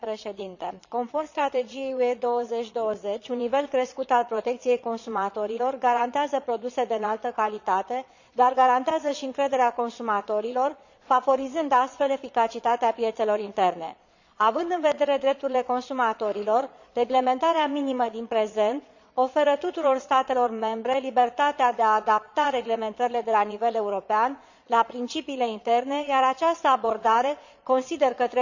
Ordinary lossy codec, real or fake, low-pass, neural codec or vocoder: none; fake; 7.2 kHz; vocoder, 22.05 kHz, 80 mel bands, Vocos